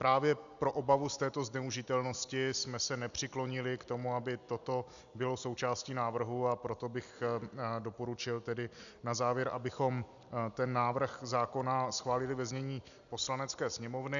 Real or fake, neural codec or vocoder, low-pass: real; none; 7.2 kHz